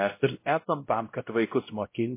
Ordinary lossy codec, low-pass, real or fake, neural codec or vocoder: MP3, 24 kbps; 3.6 kHz; fake; codec, 16 kHz, 0.5 kbps, X-Codec, WavLM features, trained on Multilingual LibriSpeech